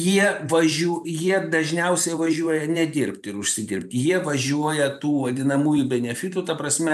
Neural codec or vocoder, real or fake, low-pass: vocoder, 44.1 kHz, 128 mel bands every 512 samples, BigVGAN v2; fake; 14.4 kHz